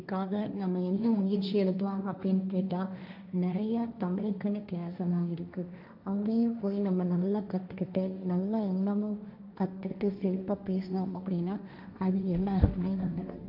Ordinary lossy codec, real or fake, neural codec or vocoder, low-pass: none; fake; codec, 16 kHz, 1.1 kbps, Voila-Tokenizer; 5.4 kHz